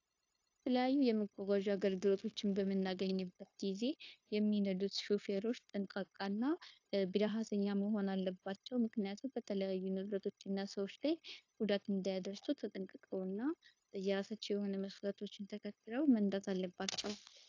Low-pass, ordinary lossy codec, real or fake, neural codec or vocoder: 7.2 kHz; AAC, 48 kbps; fake; codec, 16 kHz, 0.9 kbps, LongCat-Audio-Codec